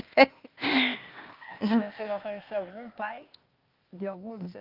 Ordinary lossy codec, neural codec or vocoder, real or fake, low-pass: Opus, 24 kbps; codec, 16 kHz, 0.8 kbps, ZipCodec; fake; 5.4 kHz